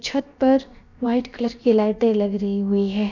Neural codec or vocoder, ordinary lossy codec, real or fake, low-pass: codec, 16 kHz, about 1 kbps, DyCAST, with the encoder's durations; none; fake; 7.2 kHz